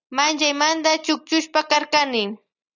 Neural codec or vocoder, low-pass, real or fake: none; 7.2 kHz; real